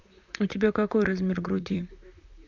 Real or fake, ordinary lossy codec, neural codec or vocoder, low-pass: fake; none; vocoder, 44.1 kHz, 128 mel bands, Pupu-Vocoder; 7.2 kHz